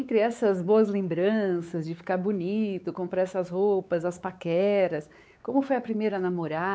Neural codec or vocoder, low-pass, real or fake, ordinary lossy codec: codec, 16 kHz, 4 kbps, X-Codec, WavLM features, trained on Multilingual LibriSpeech; none; fake; none